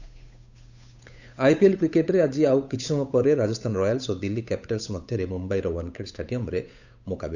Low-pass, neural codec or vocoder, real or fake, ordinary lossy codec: 7.2 kHz; codec, 16 kHz, 8 kbps, FunCodec, trained on Chinese and English, 25 frames a second; fake; none